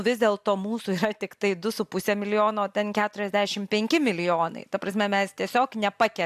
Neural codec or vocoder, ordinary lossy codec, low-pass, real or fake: none; AAC, 96 kbps; 14.4 kHz; real